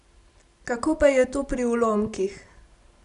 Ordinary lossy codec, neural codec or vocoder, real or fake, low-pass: MP3, 96 kbps; none; real; 10.8 kHz